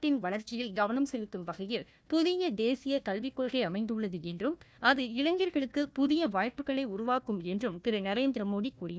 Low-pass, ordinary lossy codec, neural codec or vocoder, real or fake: none; none; codec, 16 kHz, 1 kbps, FunCodec, trained on Chinese and English, 50 frames a second; fake